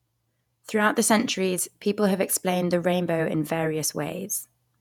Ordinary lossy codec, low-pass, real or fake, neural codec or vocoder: none; 19.8 kHz; fake; vocoder, 48 kHz, 128 mel bands, Vocos